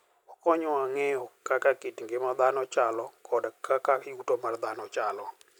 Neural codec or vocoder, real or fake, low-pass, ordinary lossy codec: none; real; none; none